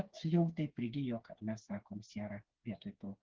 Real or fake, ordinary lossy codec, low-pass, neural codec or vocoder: fake; Opus, 16 kbps; 7.2 kHz; codec, 16 kHz, 4 kbps, FreqCodec, smaller model